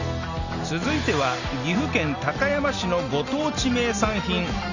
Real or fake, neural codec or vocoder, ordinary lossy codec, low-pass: real; none; none; 7.2 kHz